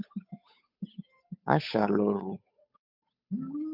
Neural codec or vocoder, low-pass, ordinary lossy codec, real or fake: codec, 16 kHz, 8 kbps, FunCodec, trained on Chinese and English, 25 frames a second; 5.4 kHz; MP3, 48 kbps; fake